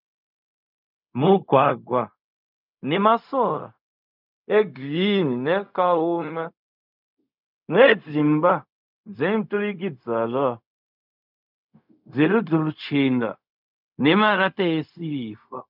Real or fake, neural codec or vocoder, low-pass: fake; codec, 16 kHz, 0.4 kbps, LongCat-Audio-Codec; 5.4 kHz